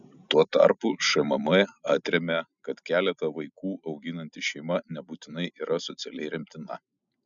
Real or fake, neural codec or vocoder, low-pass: real; none; 7.2 kHz